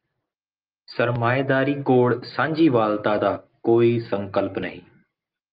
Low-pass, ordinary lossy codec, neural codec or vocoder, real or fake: 5.4 kHz; Opus, 32 kbps; none; real